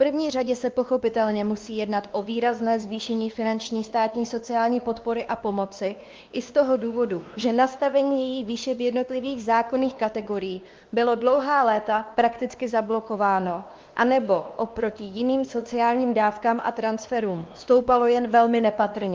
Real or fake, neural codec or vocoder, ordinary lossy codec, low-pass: fake; codec, 16 kHz, 2 kbps, X-Codec, WavLM features, trained on Multilingual LibriSpeech; Opus, 24 kbps; 7.2 kHz